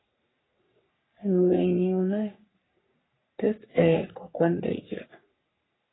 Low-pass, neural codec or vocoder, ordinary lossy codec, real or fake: 7.2 kHz; codec, 44.1 kHz, 3.4 kbps, Pupu-Codec; AAC, 16 kbps; fake